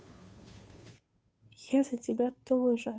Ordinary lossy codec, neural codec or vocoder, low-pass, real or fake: none; codec, 16 kHz, 2 kbps, FunCodec, trained on Chinese and English, 25 frames a second; none; fake